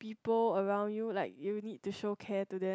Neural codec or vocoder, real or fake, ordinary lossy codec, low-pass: none; real; none; none